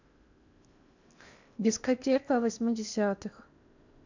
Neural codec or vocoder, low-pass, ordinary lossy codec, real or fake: codec, 16 kHz in and 24 kHz out, 0.8 kbps, FocalCodec, streaming, 65536 codes; 7.2 kHz; none; fake